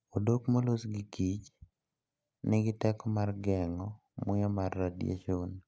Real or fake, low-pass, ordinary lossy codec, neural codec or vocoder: real; none; none; none